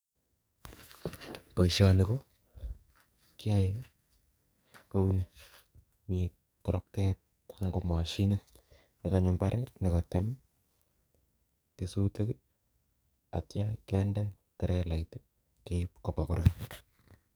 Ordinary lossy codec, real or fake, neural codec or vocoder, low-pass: none; fake; codec, 44.1 kHz, 2.6 kbps, SNAC; none